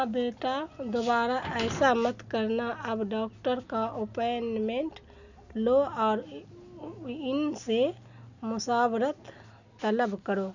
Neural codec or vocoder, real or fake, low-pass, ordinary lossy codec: none; real; 7.2 kHz; none